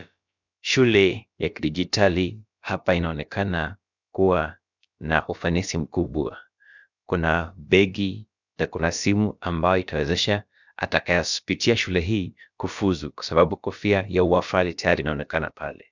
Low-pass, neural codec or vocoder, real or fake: 7.2 kHz; codec, 16 kHz, about 1 kbps, DyCAST, with the encoder's durations; fake